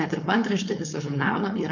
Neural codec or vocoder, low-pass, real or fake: codec, 16 kHz, 4.8 kbps, FACodec; 7.2 kHz; fake